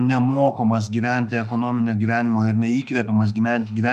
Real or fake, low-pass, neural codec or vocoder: fake; 14.4 kHz; autoencoder, 48 kHz, 32 numbers a frame, DAC-VAE, trained on Japanese speech